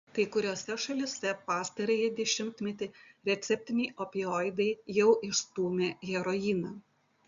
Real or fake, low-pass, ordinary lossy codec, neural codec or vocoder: real; 7.2 kHz; Opus, 64 kbps; none